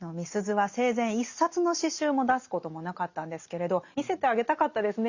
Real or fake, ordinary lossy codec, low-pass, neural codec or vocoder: real; Opus, 64 kbps; 7.2 kHz; none